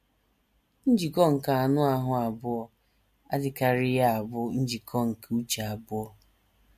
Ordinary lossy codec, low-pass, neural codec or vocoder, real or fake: MP3, 64 kbps; 14.4 kHz; none; real